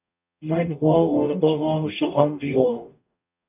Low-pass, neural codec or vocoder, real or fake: 3.6 kHz; codec, 44.1 kHz, 0.9 kbps, DAC; fake